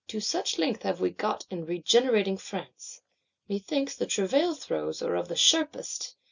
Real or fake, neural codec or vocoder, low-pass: real; none; 7.2 kHz